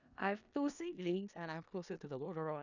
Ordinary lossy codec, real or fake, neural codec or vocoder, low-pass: none; fake; codec, 16 kHz in and 24 kHz out, 0.4 kbps, LongCat-Audio-Codec, four codebook decoder; 7.2 kHz